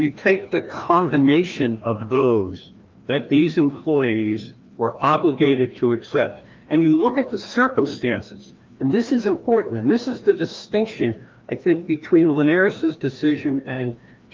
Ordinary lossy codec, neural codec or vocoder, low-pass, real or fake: Opus, 32 kbps; codec, 16 kHz, 1 kbps, FreqCodec, larger model; 7.2 kHz; fake